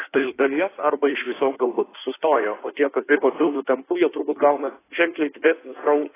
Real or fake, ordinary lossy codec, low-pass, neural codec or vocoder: fake; AAC, 16 kbps; 3.6 kHz; codec, 16 kHz in and 24 kHz out, 1.1 kbps, FireRedTTS-2 codec